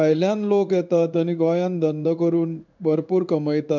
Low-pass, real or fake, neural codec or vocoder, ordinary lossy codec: 7.2 kHz; fake; codec, 16 kHz in and 24 kHz out, 1 kbps, XY-Tokenizer; none